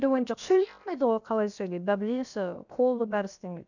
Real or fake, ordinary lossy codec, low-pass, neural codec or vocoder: fake; none; 7.2 kHz; codec, 16 kHz, about 1 kbps, DyCAST, with the encoder's durations